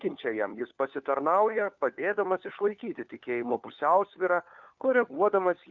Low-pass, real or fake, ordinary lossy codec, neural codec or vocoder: 7.2 kHz; fake; Opus, 24 kbps; codec, 16 kHz, 4 kbps, FunCodec, trained on LibriTTS, 50 frames a second